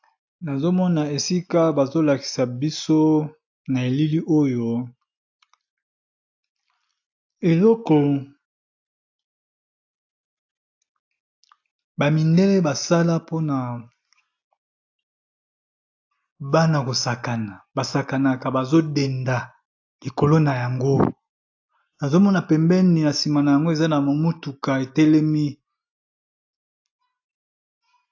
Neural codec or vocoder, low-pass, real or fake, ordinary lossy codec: none; 7.2 kHz; real; AAC, 48 kbps